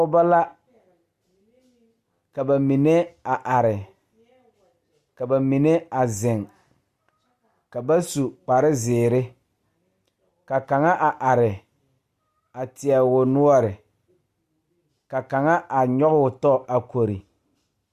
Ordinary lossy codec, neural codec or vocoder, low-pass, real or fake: Opus, 64 kbps; none; 14.4 kHz; real